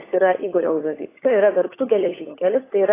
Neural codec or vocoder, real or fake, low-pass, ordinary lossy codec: codec, 16 kHz, 16 kbps, FunCodec, trained on LibriTTS, 50 frames a second; fake; 3.6 kHz; AAC, 16 kbps